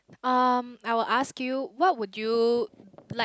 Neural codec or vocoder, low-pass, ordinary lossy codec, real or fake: none; none; none; real